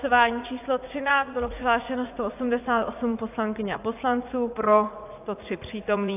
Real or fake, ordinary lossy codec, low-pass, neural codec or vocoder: fake; AAC, 32 kbps; 3.6 kHz; vocoder, 44.1 kHz, 80 mel bands, Vocos